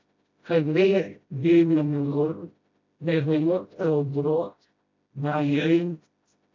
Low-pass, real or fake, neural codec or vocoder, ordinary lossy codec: 7.2 kHz; fake; codec, 16 kHz, 0.5 kbps, FreqCodec, smaller model; AAC, 48 kbps